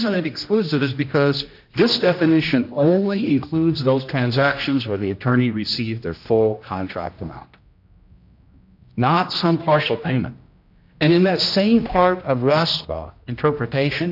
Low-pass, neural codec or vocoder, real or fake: 5.4 kHz; codec, 16 kHz, 1 kbps, X-Codec, HuBERT features, trained on general audio; fake